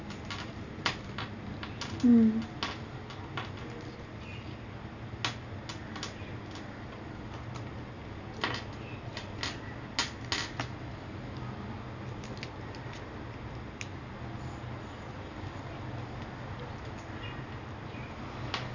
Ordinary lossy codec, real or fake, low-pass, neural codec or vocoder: none; real; 7.2 kHz; none